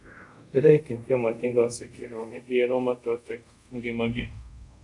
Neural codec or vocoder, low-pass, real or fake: codec, 24 kHz, 0.5 kbps, DualCodec; 10.8 kHz; fake